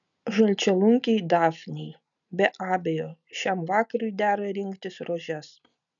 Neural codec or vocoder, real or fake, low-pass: none; real; 7.2 kHz